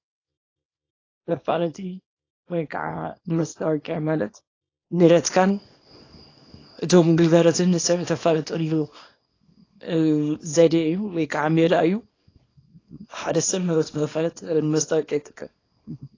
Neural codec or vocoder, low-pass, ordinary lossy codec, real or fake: codec, 24 kHz, 0.9 kbps, WavTokenizer, small release; 7.2 kHz; AAC, 32 kbps; fake